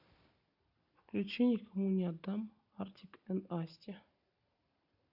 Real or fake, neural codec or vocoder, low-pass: real; none; 5.4 kHz